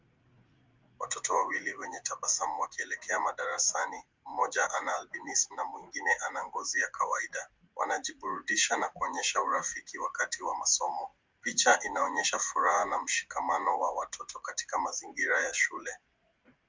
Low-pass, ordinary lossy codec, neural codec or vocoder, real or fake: 7.2 kHz; Opus, 24 kbps; vocoder, 44.1 kHz, 80 mel bands, Vocos; fake